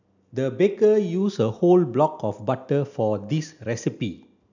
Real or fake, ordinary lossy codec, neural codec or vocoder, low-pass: real; none; none; 7.2 kHz